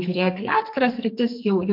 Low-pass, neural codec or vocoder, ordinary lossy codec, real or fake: 5.4 kHz; codec, 44.1 kHz, 2.6 kbps, SNAC; MP3, 48 kbps; fake